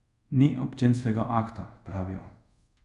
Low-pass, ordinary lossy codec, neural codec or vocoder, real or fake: 10.8 kHz; none; codec, 24 kHz, 0.5 kbps, DualCodec; fake